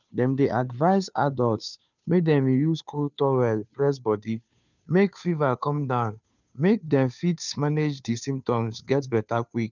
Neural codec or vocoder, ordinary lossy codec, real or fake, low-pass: codec, 16 kHz, 2 kbps, FunCodec, trained on Chinese and English, 25 frames a second; none; fake; 7.2 kHz